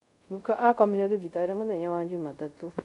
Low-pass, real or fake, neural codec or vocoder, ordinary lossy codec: 10.8 kHz; fake; codec, 24 kHz, 0.5 kbps, DualCodec; none